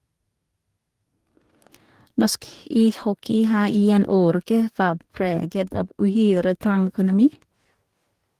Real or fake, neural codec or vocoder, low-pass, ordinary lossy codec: fake; codec, 44.1 kHz, 2.6 kbps, DAC; 14.4 kHz; Opus, 24 kbps